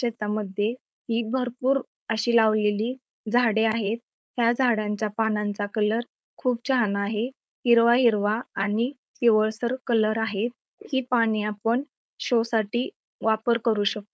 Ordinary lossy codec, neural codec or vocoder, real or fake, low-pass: none; codec, 16 kHz, 4.8 kbps, FACodec; fake; none